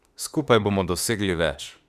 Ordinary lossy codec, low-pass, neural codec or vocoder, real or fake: Opus, 64 kbps; 14.4 kHz; autoencoder, 48 kHz, 32 numbers a frame, DAC-VAE, trained on Japanese speech; fake